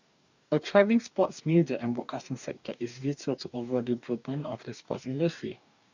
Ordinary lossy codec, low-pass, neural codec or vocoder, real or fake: none; 7.2 kHz; codec, 44.1 kHz, 2.6 kbps, DAC; fake